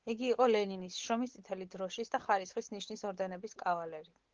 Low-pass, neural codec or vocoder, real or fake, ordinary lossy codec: 7.2 kHz; none; real; Opus, 16 kbps